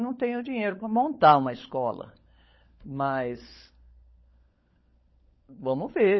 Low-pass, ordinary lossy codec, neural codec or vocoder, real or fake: 7.2 kHz; MP3, 24 kbps; codec, 16 kHz, 16 kbps, FunCodec, trained on LibriTTS, 50 frames a second; fake